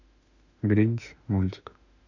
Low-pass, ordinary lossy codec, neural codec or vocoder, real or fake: 7.2 kHz; none; autoencoder, 48 kHz, 32 numbers a frame, DAC-VAE, trained on Japanese speech; fake